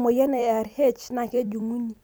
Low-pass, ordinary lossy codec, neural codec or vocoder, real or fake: none; none; vocoder, 44.1 kHz, 128 mel bands every 256 samples, BigVGAN v2; fake